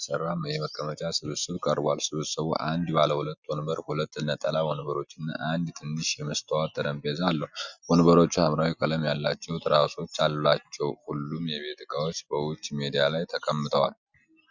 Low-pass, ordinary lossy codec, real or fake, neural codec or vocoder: 7.2 kHz; Opus, 64 kbps; real; none